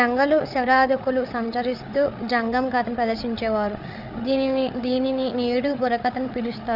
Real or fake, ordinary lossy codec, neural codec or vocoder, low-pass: fake; none; codec, 16 kHz, 8 kbps, FreqCodec, larger model; 5.4 kHz